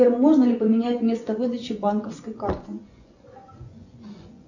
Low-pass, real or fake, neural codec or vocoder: 7.2 kHz; fake; vocoder, 24 kHz, 100 mel bands, Vocos